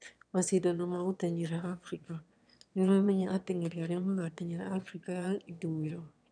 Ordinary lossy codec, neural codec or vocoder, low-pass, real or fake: none; autoencoder, 22.05 kHz, a latent of 192 numbers a frame, VITS, trained on one speaker; 9.9 kHz; fake